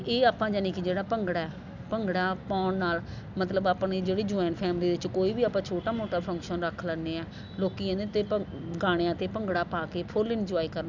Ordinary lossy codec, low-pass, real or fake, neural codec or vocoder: none; 7.2 kHz; real; none